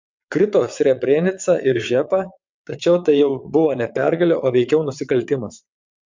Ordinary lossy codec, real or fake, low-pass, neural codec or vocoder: MP3, 64 kbps; fake; 7.2 kHz; vocoder, 22.05 kHz, 80 mel bands, WaveNeXt